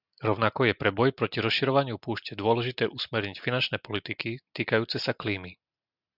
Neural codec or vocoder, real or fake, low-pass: none; real; 5.4 kHz